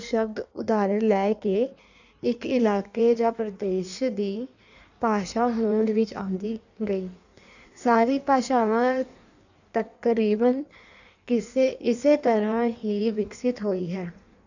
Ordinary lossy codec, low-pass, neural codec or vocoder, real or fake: none; 7.2 kHz; codec, 16 kHz in and 24 kHz out, 1.1 kbps, FireRedTTS-2 codec; fake